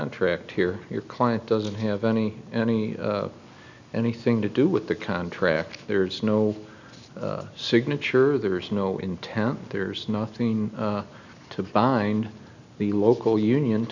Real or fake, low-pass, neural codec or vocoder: real; 7.2 kHz; none